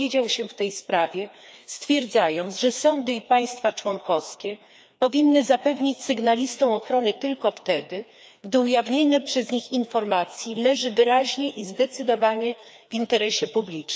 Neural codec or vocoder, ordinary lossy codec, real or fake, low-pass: codec, 16 kHz, 2 kbps, FreqCodec, larger model; none; fake; none